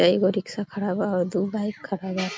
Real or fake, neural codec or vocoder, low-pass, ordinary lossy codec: real; none; none; none